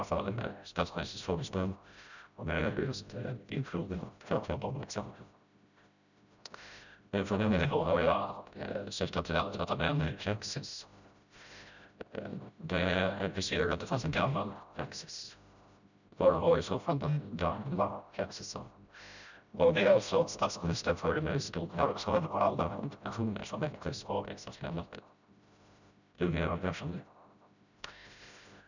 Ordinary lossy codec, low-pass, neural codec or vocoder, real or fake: none; 7.2 kHz; codec, 16 kHz, 0.5 kbps, FreqCodec, smaller model; fake